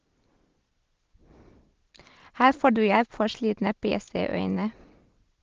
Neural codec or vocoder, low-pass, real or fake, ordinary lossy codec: none; 7.2 kHz; real; Opus, 16 kbps